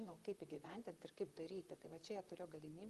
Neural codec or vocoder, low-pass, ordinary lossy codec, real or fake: vocoder, 44.1 kHz, 128 mel bands, Pupu-Vocoder; 14.4 kHz; Opus, 24 kbps; fake